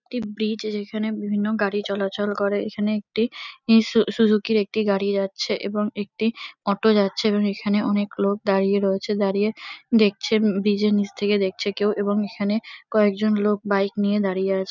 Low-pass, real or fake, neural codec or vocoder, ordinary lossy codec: 7.2 kHz; real; none; none